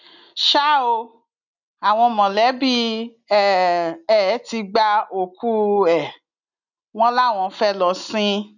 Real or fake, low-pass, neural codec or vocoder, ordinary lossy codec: real; 7.2 kHz; none; none